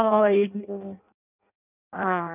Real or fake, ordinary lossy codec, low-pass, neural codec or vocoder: fake; none; 3.6 kHz; codec, 16 kHz in and 24 kHz out, 0.6 kbps, FireRedTTS-2 codec